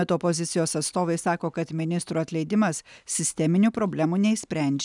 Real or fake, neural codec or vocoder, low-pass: real; none; 10.8 kHz